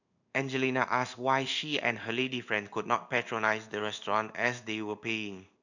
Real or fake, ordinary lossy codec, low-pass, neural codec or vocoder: fake; none; 7.2 kHz; codec, 16 kHz in and 24 kHz out, 1 kbps, XY-Tokenizer